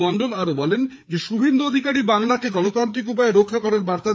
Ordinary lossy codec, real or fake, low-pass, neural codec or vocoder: none; fake; none; codec, 16 kHz, 4 kbps, FreqCodec, larger model